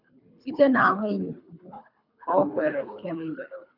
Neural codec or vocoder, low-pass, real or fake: codec, 24 kHz, 3 kbps, HILCodec; 5.4 kHz; fake